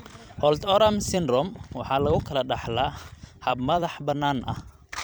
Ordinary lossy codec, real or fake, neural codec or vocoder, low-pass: none; fake; vocoder, 44.1 kHz, 128 mel bands every 256 samples, BigVGAN v2; none